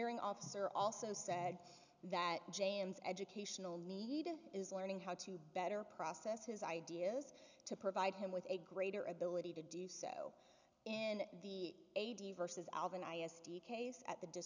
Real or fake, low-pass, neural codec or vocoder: real; 7.2 kHz; none